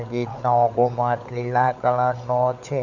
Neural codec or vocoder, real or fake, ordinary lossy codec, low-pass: codec, 16 kHz, 16 kbps, FunCodec, trained on LibriTTS, 50 frames a second; fake; none; 7.2 kHz